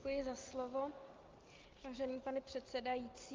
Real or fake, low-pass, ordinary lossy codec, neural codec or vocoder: real; 7.2 kHz; Opus, 32 kbps; none